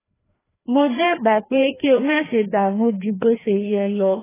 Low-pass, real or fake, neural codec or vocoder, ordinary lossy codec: 3.6 kHz; fake; codec, 16 kHz, 1 kbps, FreqCodec, larger model; AAC, 16 kbps